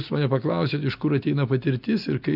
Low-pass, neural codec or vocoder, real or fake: 5.4 kHz; none; real